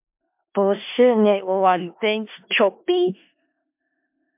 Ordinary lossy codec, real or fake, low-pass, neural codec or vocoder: MP3, 32 kbps; fake; 3.6 kHz; codec, 16 kHz in and 24 kHz out, 0.4 kbps, LongCat-Audio-Codec, four codebook decoder